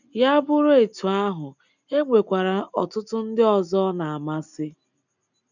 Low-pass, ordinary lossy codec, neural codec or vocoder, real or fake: 7.2 kHz; none; none; real